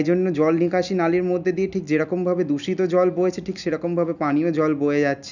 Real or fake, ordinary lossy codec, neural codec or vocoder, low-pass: real; none; none; 7.2 kHz